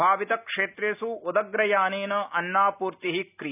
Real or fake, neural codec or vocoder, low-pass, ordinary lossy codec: real; none; 3.6 kHz; none